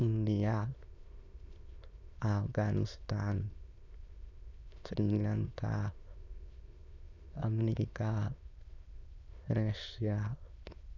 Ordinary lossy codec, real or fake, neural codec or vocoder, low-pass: none; fake; autoencoder, 22.05 kHz, a latent of 192 numbers a frame, VITS, trained on many speakers; 7.2 kHz